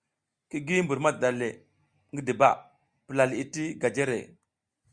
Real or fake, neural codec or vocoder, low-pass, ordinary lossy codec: real; none; 9.9 kHz; Opus, 64 kbps